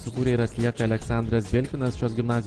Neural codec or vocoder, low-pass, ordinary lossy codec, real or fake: none; 9.9 kHz; Opus, 16 kbps; real